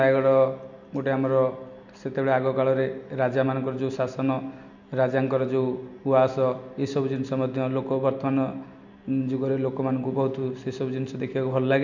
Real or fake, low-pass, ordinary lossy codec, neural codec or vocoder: real; 7.2 kHz; none; none